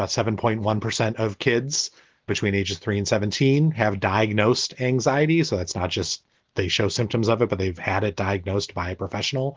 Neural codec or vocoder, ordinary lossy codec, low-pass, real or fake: none; Opus, 16 kbps; 7.2 kHz; real